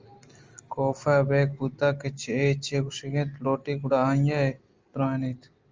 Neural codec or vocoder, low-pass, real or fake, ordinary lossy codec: none; 7.2 kHz; real; Opus, 32 kbps